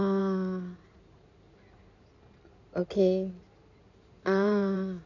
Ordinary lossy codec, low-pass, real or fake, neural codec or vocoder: none; 7.2 kHz; fake; codec, 16 kHz in and 24 kHz out, 2.2 kbps, FireRedTTS-2 codec